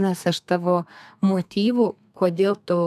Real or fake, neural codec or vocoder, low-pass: fake; codec, 32 kHz, 1.9 kbps, SNAC; 14.4 kHz